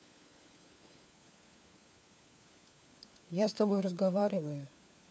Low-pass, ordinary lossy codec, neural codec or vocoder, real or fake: none; none; codec, 16 kHz, 4 kbps, FunCodec, trained on LibriTTS, 50 frames a second; fake